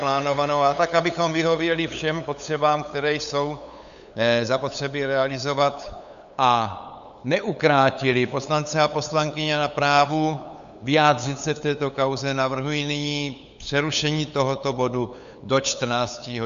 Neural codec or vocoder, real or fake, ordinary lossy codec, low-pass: codec, 16 kHz, 8 kbps, FunCodec, trained on LibriTTS, 25 frames a second; fake; AAC, 96 kbps; 7.2 kHz